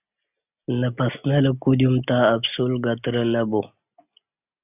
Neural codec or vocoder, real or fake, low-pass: none; real; 3.6 kHz